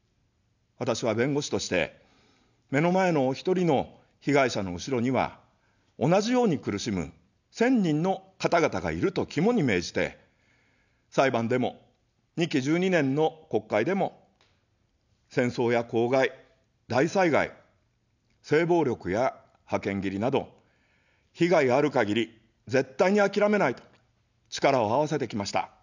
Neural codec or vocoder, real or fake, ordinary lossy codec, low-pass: none; real; none; 7.2 kHz